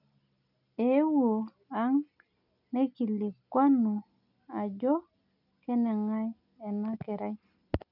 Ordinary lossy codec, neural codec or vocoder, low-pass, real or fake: none; none; 5.4 kHz; real